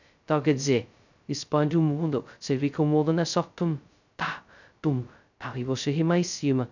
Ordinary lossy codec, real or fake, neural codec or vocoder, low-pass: none; fake; codec, 16 kHz, 0.2 kbps, FocalCodec; 7.2 kHz